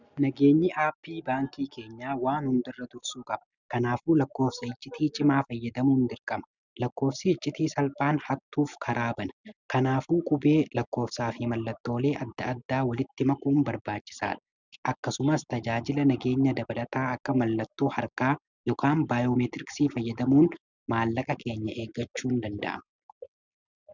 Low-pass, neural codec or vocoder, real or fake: 7.2 kHz; none; real